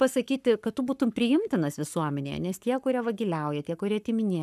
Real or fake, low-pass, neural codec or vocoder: fake; 14.4 kHz; codec, 44.1 kHz, 7.8 kbps, Pupu-Codec